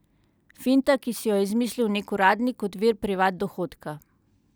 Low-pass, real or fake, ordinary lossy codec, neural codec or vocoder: none; real; none; none